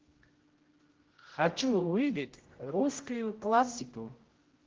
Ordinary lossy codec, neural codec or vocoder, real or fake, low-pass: Opus, 16 kbps; codec, 16 kHz, 0.5 kbps, X-Codec, HuBERT features, trained on general audio; fake; 7.2 kHz